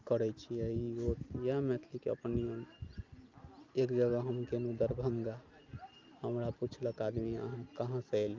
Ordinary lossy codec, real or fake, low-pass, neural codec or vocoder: Opus, 24 kbps; real; 7.2 kHz; none